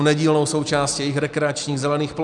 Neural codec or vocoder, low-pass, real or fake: none; 10.8 kHz; real